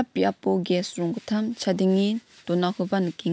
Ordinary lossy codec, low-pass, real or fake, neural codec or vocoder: none; none; real; none